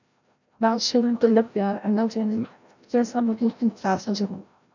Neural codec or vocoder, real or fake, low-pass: codec, 16 kHz, 0.5 kbps, FreqCodec, larger model; fake; 7.2 kHz